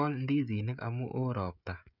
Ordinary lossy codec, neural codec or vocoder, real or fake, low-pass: none; codec, 16 kHz, 16 kbps, FreqCodec, smaller model; fake; 5.4 kHz